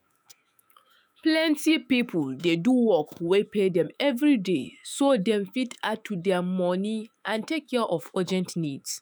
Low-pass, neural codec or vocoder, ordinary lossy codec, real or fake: none; autoencoder, 48 kHz, 128 numbers a frame, DAC-VAE, trained on Japanese speech; none; fake